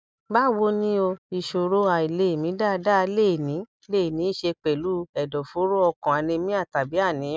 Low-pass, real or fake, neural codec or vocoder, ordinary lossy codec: none; real; none; none